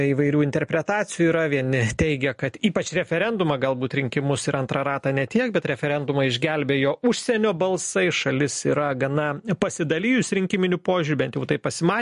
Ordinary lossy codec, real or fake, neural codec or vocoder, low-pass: MP3, 48 kbps; real; none; 10.8 kHz